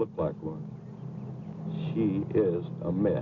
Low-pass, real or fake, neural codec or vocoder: 7.2 kHz; real; none